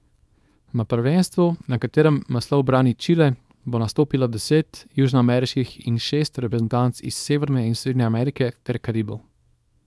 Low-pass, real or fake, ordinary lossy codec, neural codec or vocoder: none; fake; none; codec, 24 kHz, 0.9 kbps, WavTokenizer, small release